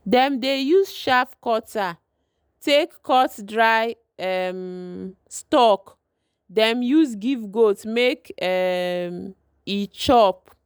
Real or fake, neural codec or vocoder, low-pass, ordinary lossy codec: real; none; none; none